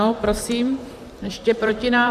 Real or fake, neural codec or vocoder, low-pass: fake; vocoder, 44.1 kHz, 128 mel bands, Pupu-Vocoder; 14.4 kHz